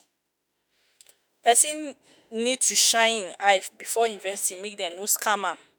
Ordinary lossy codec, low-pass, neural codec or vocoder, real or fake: none; none; autoencoder, 48 kHz, 32 numbers a frame, DAC-VAE, trained on Japanese speech; fake